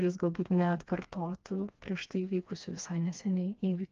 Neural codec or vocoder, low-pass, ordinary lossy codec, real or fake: codec, 16 kHz, 2 kbps, FreqCodec, smaller model; 7.2 kHz; Opus, 32 kbps; fake